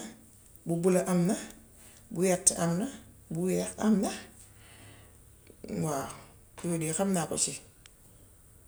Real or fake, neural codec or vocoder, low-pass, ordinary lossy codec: real; none; none; none